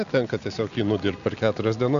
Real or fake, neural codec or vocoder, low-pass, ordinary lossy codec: real; none; 7.2 kHz; MP3, 96 kbps